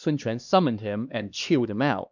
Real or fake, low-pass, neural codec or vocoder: fake; 7.2 kHz; codec, 16 kHz, 2 kbps, X-Codec, HuBERT features, trained on LibriSpeech